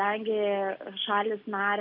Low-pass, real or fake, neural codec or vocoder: 5.4 kHz; real; none